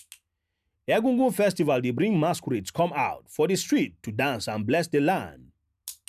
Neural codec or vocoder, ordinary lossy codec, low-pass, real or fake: none; none; 14.4 kHz; real